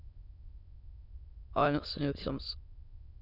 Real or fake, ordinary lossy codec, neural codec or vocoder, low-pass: fake; none; autoencoder, 22.05 kHz, a latent of 192 numbers a frame, VITS, trained on many speakers; 5.4 kHz